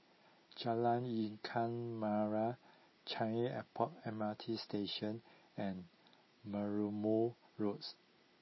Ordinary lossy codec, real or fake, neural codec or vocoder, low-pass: MP3, 24 kbps; real; none; 7.2 kHz